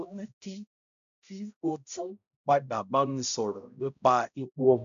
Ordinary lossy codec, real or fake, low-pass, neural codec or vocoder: none; fake; 7.2 kHz; codec, 16 kHz, 0.5 kbps, X-Codec, HuBERT features, trained on balanced general audio